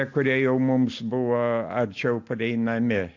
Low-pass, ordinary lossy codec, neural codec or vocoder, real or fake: 7.2 kHz; AAC, 48 kbps; none; real